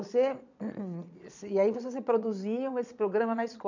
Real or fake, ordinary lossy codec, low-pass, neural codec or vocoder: fake; none; 7.2 kHz; vocoder, 22.05 kHz, 80 mel bands, Vocos